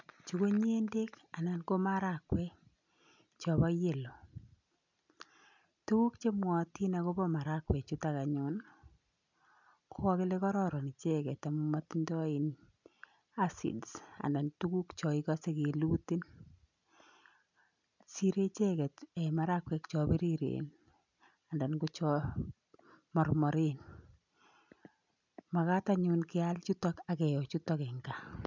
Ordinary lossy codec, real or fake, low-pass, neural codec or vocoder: none; real; 7.2 kHz; none